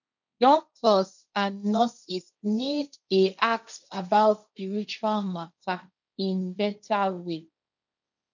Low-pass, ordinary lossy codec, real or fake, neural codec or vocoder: 7.2 kHz; none; fake; codec, 16 kHz, 1.1 kbps, Voila-Tokenizer